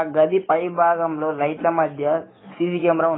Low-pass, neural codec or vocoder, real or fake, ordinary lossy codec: 7.2 kHz; codec, 44.1 kHz, 7.8 kbps, DAC; fake; AAC, 16 kbps